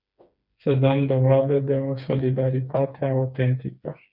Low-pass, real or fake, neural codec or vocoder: 5.4 kHz; fake; codec, 16 kHz, 4 kbps, FreqCodec, smaller model